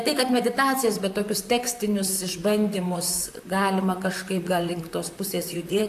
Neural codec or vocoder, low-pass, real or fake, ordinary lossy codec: vocoder, 44.1 kHz, 128 mel bands, Pupu-Vocoder; 14.4 kHz; fake; AAC, 64 kbps